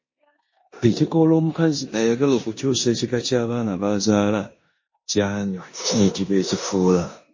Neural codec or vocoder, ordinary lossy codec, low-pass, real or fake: codec, 16 kHz in and 24 kHz out, 0.9 kbps, LongCat-Audio-Codec, four codebook decoder; MP3, 32 kbps; 7.2 kHz; fake